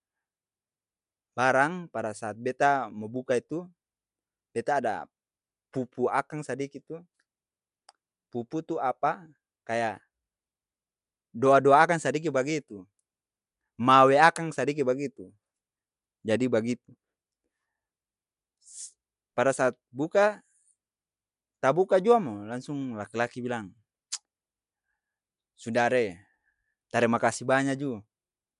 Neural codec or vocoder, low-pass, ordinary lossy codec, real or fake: none; 10.8 kHz; none; real